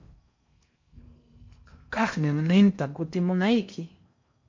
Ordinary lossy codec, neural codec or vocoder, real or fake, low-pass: MP3, 48 kbps; codec, 16 kHz in and 24 kHz out, 0.6 kbps, FocalCodec, streaming, 4096 codes; fake; 7.2 kHz